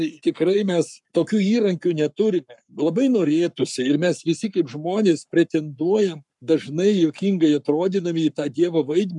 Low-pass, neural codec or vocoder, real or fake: 10.8 kHz; codec, 44.1 kHz, 7.8 kbps, Pupu-Codec; fake